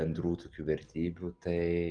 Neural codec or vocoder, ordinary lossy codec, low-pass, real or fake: none; Opus, 24 kbps; 9.9 kHz; real